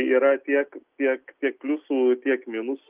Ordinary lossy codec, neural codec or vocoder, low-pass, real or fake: Opus, 24 kbps; none; 3.6 kHz; real